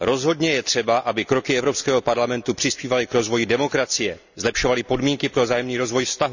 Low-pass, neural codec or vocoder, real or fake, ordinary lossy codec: 7.2 kHz; none; real; none